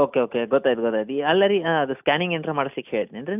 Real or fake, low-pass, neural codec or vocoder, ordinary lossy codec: real; 3.6 kHz; none; none